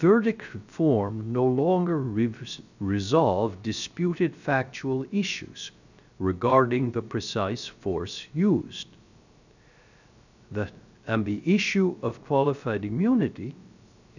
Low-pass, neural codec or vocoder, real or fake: 7.2 kHz; codec, 16 kHz, 0.3 kbps, FocalCodec; fake